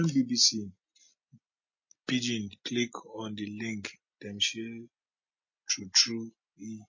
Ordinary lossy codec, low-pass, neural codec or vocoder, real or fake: MP3, 32 kbps; 7.2 kHz; none; real